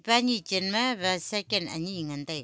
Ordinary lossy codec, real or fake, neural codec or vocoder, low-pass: none; real; none; none